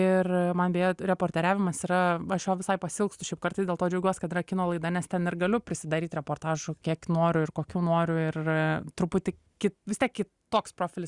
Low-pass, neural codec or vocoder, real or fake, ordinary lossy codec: 10.8 kHz; none; real; Opus, 64 kbps